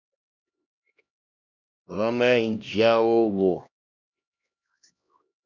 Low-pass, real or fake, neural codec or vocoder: 7.2 kHz; fake; codec, 16 kHz, 1 kbps, X-Codec, HuBERT features, trained on LibriSpeech